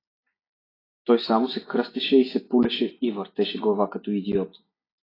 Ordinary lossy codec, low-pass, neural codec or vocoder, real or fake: AAC, 24 kbps; 5.4 kHz; none; real